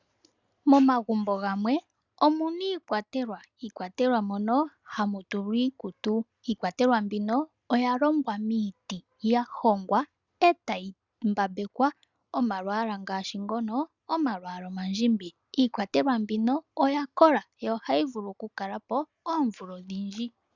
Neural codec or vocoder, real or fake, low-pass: none; real; 7.2 kHz